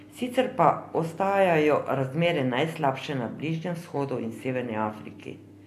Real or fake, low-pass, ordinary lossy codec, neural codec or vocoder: real; 14.4 kHz; AAC, 64 kbps; none